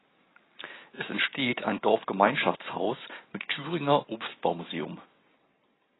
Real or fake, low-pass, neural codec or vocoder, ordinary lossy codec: real; 7.2 kHz; none; AAC, 16 kbps